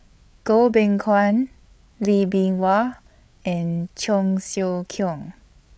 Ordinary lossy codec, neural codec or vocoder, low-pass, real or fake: none; codec, 16 kHz, 8 kbps, FreqCodec, larger model; none; fake